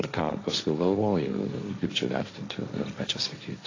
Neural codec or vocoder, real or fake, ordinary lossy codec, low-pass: codec, 16 kHz, 1.1 kbps, Voila-Tokenizer; fake; AAC, 32 kbps; 7.2 kHz